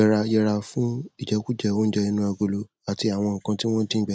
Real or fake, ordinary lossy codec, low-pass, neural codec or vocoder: real; none; none; none